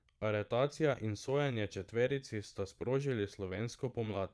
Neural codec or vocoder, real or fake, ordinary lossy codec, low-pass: vocoder, 22.05 kHz, 80 mel bands, Vocos; fake; none; none